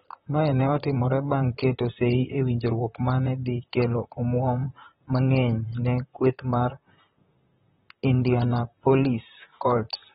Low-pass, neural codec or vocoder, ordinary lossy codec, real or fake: 19.8 kHz; vocoder, 44.1 kHz, 128 mel bands, Pupu-Vocoder; AAC, 16 kbps; fake